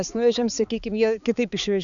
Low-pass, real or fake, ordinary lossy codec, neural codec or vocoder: 7.2 kHz; fake; MP3, 96 kbps; codec, 16 kHz, 4 kbps, X-Codec, HuBERT features, trained on balanced general audio